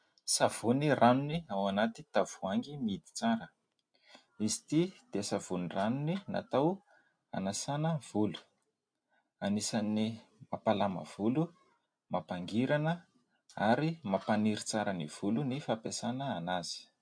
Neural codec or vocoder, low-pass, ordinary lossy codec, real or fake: none; 9.9 kHz; MP3, 64 kbps; real